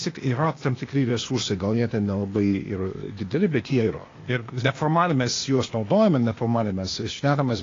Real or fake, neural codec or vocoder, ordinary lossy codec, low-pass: fake; codec, 16 kHz, 0.8 kbps, ZipCodec; AAC, 32 kbps; 7.2 kHz